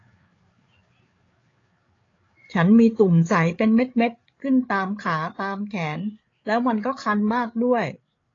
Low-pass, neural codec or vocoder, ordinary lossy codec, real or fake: 7.2 kHz; codec, 16 kHz, 8 kbps, FreqCodec, larger model; AAC, 32 kbps; fake